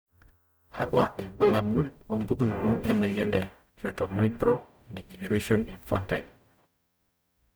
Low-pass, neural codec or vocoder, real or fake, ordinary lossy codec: none; codec, 44.1 kHz, 0.9 kbps, DAC; fake; none